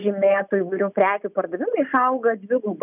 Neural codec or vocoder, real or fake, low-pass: none; real; 3.6 kHz